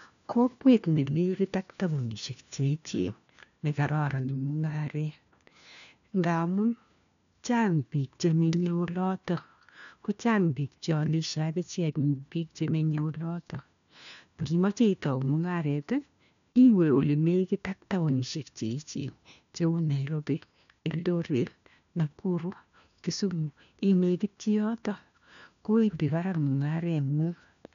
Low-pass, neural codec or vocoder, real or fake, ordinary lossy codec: 7.2 kHz; codec, 16 kHz, 1 kbps, FunCodec, trained on LibriTTS, 50 frames a second; fake; none